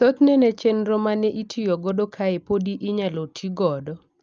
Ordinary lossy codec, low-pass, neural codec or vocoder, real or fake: Opus, 24 kbps; 7.2 kHz; none; real